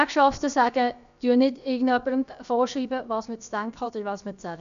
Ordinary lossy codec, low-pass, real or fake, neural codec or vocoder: none; 7.2 kHz; fake; codec, 16 kHz, about 1 kbps, DyCAST, with the encoder's durations